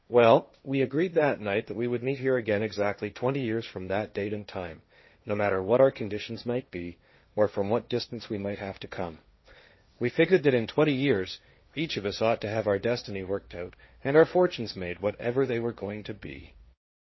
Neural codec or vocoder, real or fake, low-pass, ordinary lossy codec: codec, 16 kHz, 1.1 kbps, Voila-Tokenizer; fake; 7.2 kHz; MP3, 24 kbps